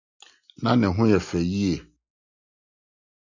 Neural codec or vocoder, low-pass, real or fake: none; 7.2 kHz; real